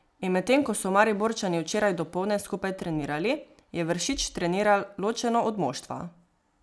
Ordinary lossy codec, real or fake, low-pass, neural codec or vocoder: none; real; none; none